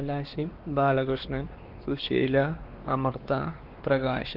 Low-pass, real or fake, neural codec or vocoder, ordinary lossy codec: 5.4 kHz; fake; codec, 16 kHz, 2 kbps, X-Codec, WavLM features, trained on Multilingual LibriSpeech; Opus, 16 kbps